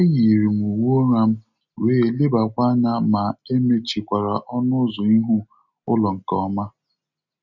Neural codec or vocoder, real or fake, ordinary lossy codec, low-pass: none; real; none; 7.2 kHz